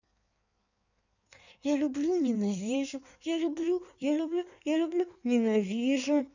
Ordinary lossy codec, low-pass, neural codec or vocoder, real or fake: none; 7.2 kHz; codec, 16 kHz in and 24 kHz out, 1.1 kbps, FireRedTTS-2 codec; fake